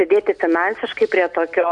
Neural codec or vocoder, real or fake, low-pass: none; real; 10.8 kHz